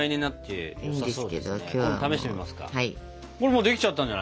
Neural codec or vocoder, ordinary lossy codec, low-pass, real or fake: none; none; none; real